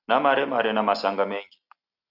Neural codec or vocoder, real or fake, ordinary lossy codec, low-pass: none; real; Opus, 64 kbps; 5.4 kHz